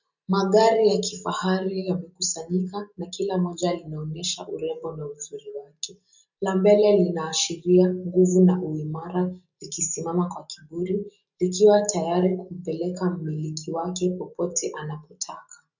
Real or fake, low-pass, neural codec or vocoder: real; 7.2 kHz; none